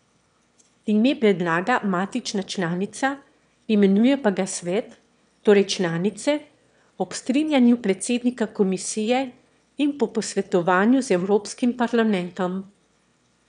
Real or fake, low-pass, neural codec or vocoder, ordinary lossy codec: fake; 9.9 kHz; autoencoder, 22.05 kHz, a latent of 192 numbers a frame, VITS, trained on one speaker; none